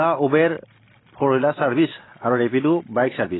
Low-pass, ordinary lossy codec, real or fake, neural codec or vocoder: 7.2 kHz; AAC, 16 kbps; real; none